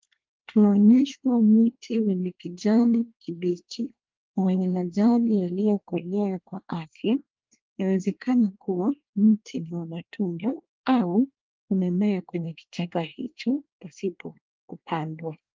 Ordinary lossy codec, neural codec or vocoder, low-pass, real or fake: Opus, 32 kbps; codec, 24 kHz, 1 kbps, SNAC; 7.2 kHz; fake